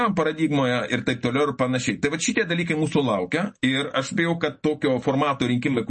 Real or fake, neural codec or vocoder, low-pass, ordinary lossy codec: real; none; 10.8 kHz; MP3, 32 kbps